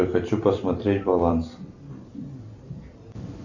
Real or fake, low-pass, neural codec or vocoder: fake; 7.2 kHz; vocoder, 44.1 kHz, 128 mel bands every 256 samples, BigVGAN v2